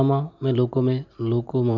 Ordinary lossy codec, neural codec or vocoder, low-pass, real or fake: none; none; 7.2 kHz; real